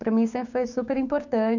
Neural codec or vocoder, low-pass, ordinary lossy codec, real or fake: codec, 16 kHz, 6 kbps, DAC; 7.2 kHz; none; fake